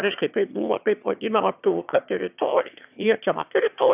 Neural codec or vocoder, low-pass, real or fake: autoencoder, 22.05 kHz, a latent of 192 numbers a frame, VITS, trained on one speaker; 3.6 kHz; fake